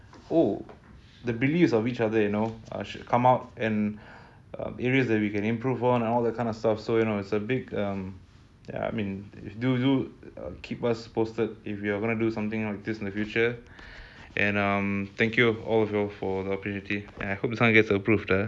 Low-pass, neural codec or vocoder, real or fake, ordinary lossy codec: none; none; real; none